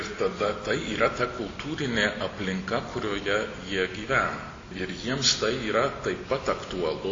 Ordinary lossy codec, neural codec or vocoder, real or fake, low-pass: AAC, 32 kbps; none; real; 7.2 kHz